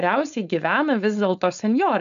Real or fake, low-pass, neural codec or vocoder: fake; 7.2 kHz; codec, 16 kHz, 4.8 kbps, FACodec